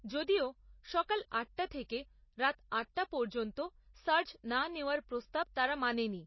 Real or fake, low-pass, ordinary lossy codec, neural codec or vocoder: real; 7.2 kHz; MP3, 24 kbps; none